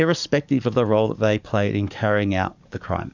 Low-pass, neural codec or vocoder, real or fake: 7.2 kHz; codec, 44.1 kHz, 7.8 kbps, DAC; fake